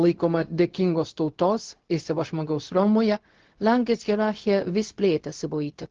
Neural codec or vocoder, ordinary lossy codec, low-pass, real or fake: codec, 16 kHz, 0.4 kbps, LongCat-Audio-Codec; Opus, 16 kbps; 7.2 kHz; fake